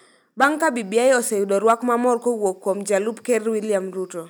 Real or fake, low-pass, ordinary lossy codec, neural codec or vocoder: real; none; none; none